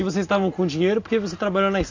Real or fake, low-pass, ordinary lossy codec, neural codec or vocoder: real; 7.2 kHz; AAC, 48 kbps; none